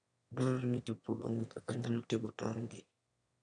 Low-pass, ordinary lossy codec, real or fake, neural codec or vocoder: 9.9 kHz; none; fake; autoencoder, 22.05 kHz, a latent of 192 numbers a frame, VITS, trained on one speaker